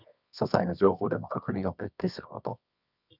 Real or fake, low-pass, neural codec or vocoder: fake; 5.4 kHz; codec, 24 kHz, 0.9 kbps, WavTokenizer, medium music audio release